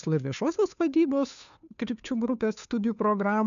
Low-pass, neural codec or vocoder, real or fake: 7.2 kHz; codec, 16 kHz, 2 kbps, FunCodec, trained on Chinese and English, 25 frames a second; fake